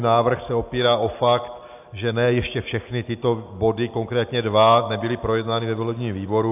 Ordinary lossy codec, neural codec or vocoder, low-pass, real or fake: AAC, 32 kbps; none; 3.6 kHz; real